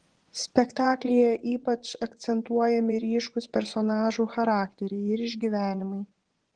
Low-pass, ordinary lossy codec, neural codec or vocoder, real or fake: 9.9 kHz; Opus, 16 kbps; vocoder, 24 kHz, 100 mel bands, Vocos; fake